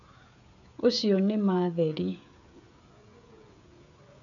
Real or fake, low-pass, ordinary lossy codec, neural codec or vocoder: fake; 7.2 kHz; none; codec, 16 kHz, 16 kbps, FreqCodec, smaller model